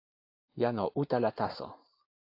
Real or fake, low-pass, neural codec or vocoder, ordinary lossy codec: real; 5.4 kHz; none; AAC, 32 kbps